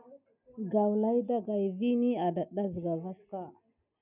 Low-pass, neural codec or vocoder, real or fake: 3.6 kHz; none; real